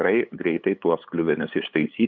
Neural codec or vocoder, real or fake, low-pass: codec, 16 kHz, 8 kbps, FunCodec, trained on LibriTTS, 25 frames a second; fake; 7.2 kHz